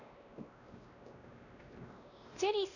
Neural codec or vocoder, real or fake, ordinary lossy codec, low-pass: codec, 16 kHz, 1 kbps, X-Codec, WavLM features, trained on Multilingual LibriSpeech; fake; none; 7.2 kHz